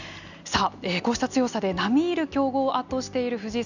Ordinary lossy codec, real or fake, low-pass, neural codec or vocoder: none; real; 7.2 kHz; none